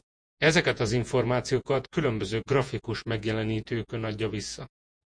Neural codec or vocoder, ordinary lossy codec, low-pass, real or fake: vocoder, 48 kHz, 128 mel bands, Vocos; MP3, 64 kbps; 9.9 kHz; fake